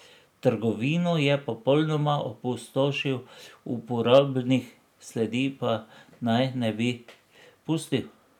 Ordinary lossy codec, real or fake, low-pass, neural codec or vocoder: none; real; 19.8 kHz; none